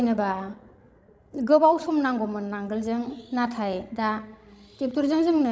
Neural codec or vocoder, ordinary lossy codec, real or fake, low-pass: codec, 16 kHz, 16 kbps, FreqCodec, larger model; none; fake; none